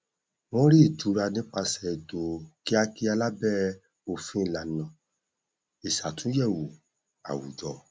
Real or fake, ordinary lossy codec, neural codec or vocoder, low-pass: real; none; none; none